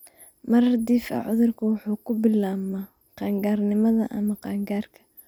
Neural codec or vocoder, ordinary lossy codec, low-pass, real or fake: none; none; none; real